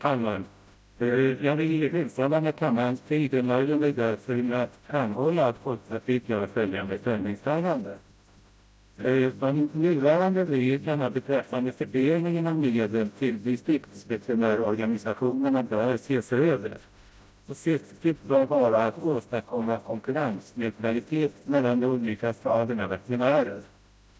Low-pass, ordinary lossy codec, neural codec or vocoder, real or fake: none; none; codec, 16 kHz, 0.5 kbps, FreqCodec, smaller model; fake